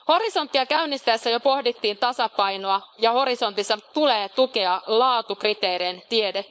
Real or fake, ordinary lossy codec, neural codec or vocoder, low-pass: fake; none; codec, 16 kHz, 4.8 kbps, FACodec; none